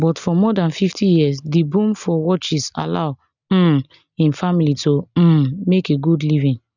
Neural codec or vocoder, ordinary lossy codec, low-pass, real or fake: none; none; 7.2 kHz; real